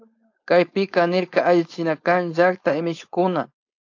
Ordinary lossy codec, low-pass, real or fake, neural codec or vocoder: AAC, 48 kbps; 7.2 kHz; fake; codec, 16 kHz, 4.8 kbps, FACodec